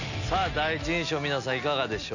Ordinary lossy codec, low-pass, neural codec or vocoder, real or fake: none; 7.2 kHz; none; real